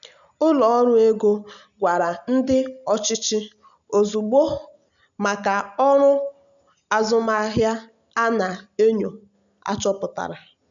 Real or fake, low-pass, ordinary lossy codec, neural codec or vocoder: real; 7.2 kHz; none; none